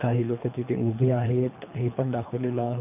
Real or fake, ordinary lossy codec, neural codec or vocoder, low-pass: fake; none; codec, 24 kHz, 3 kbps, HILCodec; 3.6 kHz